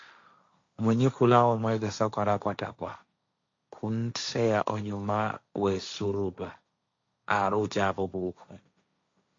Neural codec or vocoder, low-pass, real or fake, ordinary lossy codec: codec, 16 kHz, 1.1 kbps, Voila-Tokenizer; 7.2 kHz; fake; MP3, 48 kbps